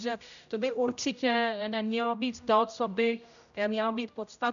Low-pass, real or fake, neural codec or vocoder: 7.2 kHz; fake; codec, 16 kHz, 0.5 kbps, X-Codec, HuBERT features, trained on general audio